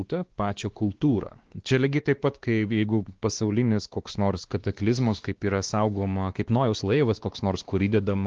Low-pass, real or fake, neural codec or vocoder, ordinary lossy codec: 7.2 kHz; fake; codec, 16 kHz, 2 kbps, X-Codec, WavLM features, trained on Multilingual LibriSpeech; Opus, 16 kbps